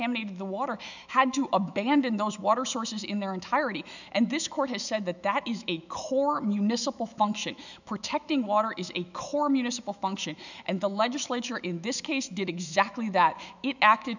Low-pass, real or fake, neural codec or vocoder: 7.2 kHz; fake; autoencoder, 48 kHz, 128 numbers a frame, DAC-VAE, trained on Japanese speech